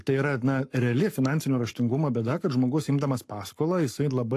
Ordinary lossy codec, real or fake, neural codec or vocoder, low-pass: AAC, 64 kbps; fake; codec, 44.1 kHz, 7.8 kbps, Pupu-Codec; 14.4 kHz